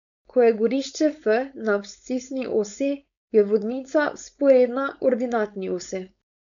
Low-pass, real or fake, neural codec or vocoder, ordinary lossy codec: 7.2 kHz; fake; codec, 16 kHz, 4.8 kbps, FACodec; none